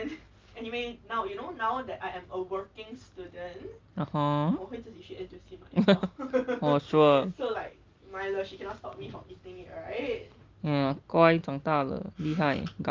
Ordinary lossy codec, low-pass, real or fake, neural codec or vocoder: Opus, 24 kbps; 7.2 kHz; real; none